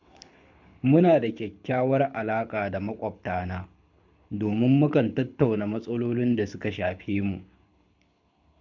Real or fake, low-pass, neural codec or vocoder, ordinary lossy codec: fake; 7.2 kHz; codec, 24 kHz, 6 kbps, HILCodec; MP3, 64 kbps